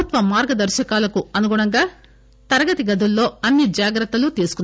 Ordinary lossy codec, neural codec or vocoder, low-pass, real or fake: none; none; none; real